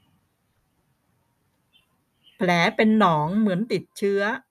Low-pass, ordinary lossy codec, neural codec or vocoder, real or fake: 14.4 kHz; none; none; real